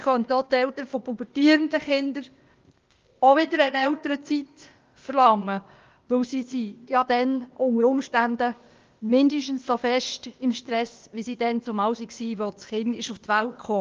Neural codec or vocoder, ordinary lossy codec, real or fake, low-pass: codec, 16 kHz, 0.8 kbps, ZipCodec; Opus, 32 kbps; fake; 7.2 kHz